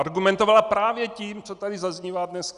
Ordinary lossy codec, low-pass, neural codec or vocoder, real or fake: Opus, 64 kbps; 10.8 kHz; none; real